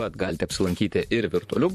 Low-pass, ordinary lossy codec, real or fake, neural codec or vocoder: 14.4 kHz; MP3, 64 kbps; fake; vocoder, 44.1 kHz, 128 mel bands, Pupu-Vocoder